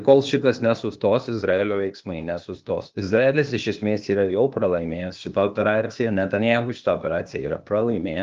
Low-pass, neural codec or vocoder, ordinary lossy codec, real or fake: 7.2 kHz; codec, 16 kHz, 0.8 kbps, ZipCodec; Opus, 32 kbps; fake